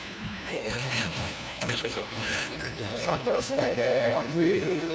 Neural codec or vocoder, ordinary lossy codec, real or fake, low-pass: codec, 16 kHz, 1 kbps, FunCodec, trained on LibriTTS, 50 frames a second; none; fake; none